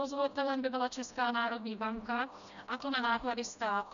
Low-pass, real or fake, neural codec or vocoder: 7.2 kHz; fake; codec, 16 kHz, 1 kbps, FreqCodec, smaller model